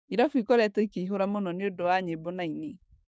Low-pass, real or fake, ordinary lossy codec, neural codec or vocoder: none; fake; none; codec, 16 kHz, 6 kbps, DAC